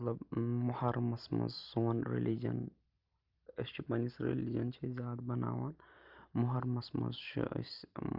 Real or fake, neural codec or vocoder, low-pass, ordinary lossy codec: real; none; 5.4 kHz; Opus, 32 kbps